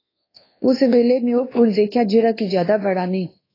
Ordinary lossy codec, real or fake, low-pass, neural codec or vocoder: AAC, 24 kbps; fake; 5.4 kHz; codec, 24 kHz, 1.2 kbps, DualCodec